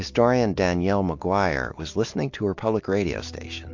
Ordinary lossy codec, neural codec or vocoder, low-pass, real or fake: MP3, 48 kbps; none; 7.2 kHz; real